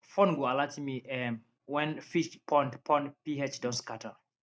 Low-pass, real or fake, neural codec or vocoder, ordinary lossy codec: none; real; none; none